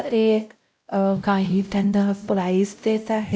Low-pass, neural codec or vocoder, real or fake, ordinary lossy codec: none; codec, 16 kHz, 0.5 kbps, X-Codec, WavLM features, trained on Multilingual LibriSpeech; fake; none